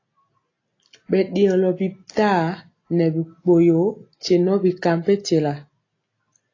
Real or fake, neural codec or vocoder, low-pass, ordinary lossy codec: real; none; 7.2 kHz; AAC, 32 kbps